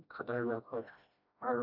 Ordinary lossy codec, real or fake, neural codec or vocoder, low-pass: none; fake; codec, 16 kHz, 1 kbps, FreqCodec, smaller model; 5.4 kHz